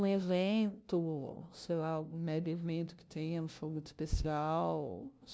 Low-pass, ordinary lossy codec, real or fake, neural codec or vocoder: none; none; fake; codec, 16 kHz, 0.5 kbps, FunCodec, trained on LibriTTS, 25 frames a second